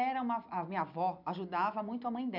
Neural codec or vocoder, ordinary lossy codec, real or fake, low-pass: none; none; real; 5.4 kHz